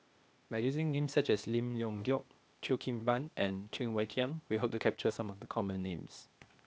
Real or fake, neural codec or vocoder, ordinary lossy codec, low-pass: fake; codec, 16 kHz, 0.8 kbps, ZipCodec; none; none